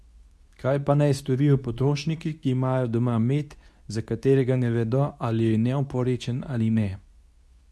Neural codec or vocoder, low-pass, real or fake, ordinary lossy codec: codec, 24 kHz, 0.9 kbps, WavTokenizer, medium speech release version 2; none; fake; none